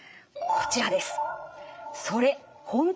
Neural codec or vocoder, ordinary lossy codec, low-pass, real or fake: codec, 16 kHz, 8 kbps, FreqCodec, larger model; none; none; fake